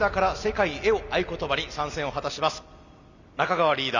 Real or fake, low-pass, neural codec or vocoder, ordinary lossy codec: real; 7.2 kHz; none; none